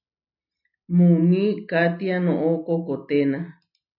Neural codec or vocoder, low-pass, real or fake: none; 5.4 kHz; real